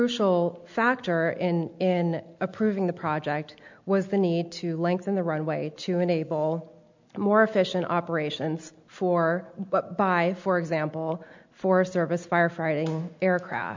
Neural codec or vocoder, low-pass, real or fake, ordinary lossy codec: none; 7.2 kHz; real; MP3, 64 kbps